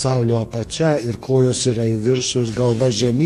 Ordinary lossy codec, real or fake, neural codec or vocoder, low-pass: AAC, 64 kbps; fake; codec, 44.1 kHz, 2.6 kbps, DAC; 14.4 kHz